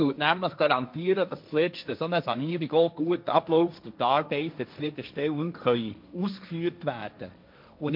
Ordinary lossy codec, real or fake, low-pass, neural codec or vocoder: MP3, 48 kbps; fake; 5.4 kHz; codec, 16 kHz, 1.1 kbps, Voila-Tokenizer